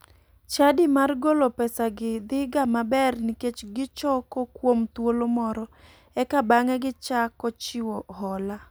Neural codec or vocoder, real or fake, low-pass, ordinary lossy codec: none; real; none; none